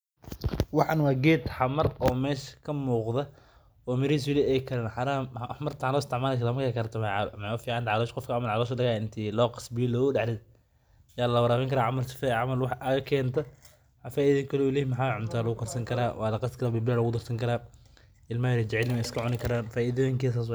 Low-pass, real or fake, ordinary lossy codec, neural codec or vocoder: none; real; none; none